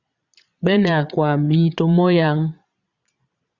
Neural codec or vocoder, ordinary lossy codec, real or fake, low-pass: vocoder, 22.05 kHz, 80 mel bands, Vocos; Opus, 64 kbps; fake; 7.2 kHz